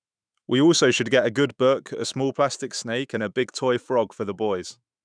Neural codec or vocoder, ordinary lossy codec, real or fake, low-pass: autoencoder, 48 kHz, 128 numbers a frame, DAC-VAE, trained on Japanese speech; none; fake; 9.9 kHz